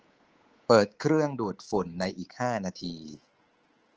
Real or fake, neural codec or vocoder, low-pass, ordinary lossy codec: fake; codec, 24 kHz, 3.1 kbps, DualCodec; 7.2 kHz; Opus, 16 kbps